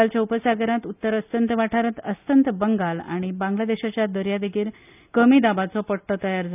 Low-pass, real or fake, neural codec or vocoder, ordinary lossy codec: 3.6 kHz; real; none; none